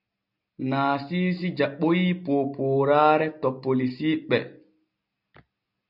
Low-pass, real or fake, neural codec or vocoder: 5.4 kHz; real; none